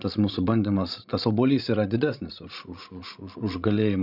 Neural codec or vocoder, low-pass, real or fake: codec, 16 kHz, 16 kbps, FreqCodec, larger model; 5.4 kHz; fake